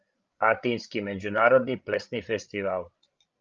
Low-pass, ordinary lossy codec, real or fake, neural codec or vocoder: 7.2 kHz; Opus, 24 kbps; fake; codec, 16 kHz, 16 kbps, FreqCodec, larger model